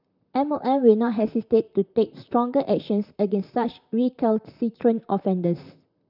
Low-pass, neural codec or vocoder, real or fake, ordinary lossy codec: 5.4 kHz; vocoder, 44.1 kHz, 128 mel bands, Pupu-Vocoder; fake; none